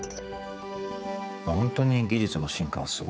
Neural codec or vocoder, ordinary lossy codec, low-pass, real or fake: codec, 16 kHz, 4 kbps, X-Codec, HuBERT features, trained on general audio; none; none; fake